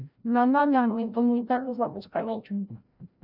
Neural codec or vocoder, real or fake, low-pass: codec, 16 kHz, 0.5 kbps, FreqCodec, larger model; fake; 5.4 kHz